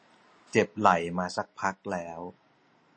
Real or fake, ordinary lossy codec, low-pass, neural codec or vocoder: real; MP3, 32 kbps; 9.9 kHz; none